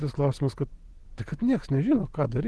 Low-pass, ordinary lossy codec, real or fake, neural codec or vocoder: 10.8 kHz; Opus, 16 kbps; real; none